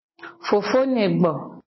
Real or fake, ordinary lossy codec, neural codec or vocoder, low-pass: real; MP3, 24 kbps; none; 7.2 kHz